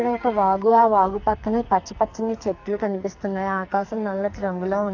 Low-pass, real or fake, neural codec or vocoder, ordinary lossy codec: 7.2 kHz; fake; codec, 44.1 kHz, 2.6 kbps, SNAC; AAC, 48 kbps